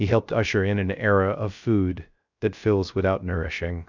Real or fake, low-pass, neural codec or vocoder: fake; 7.2 kHz; codec, 16 kHz, 0.3 kbps, FocalCodec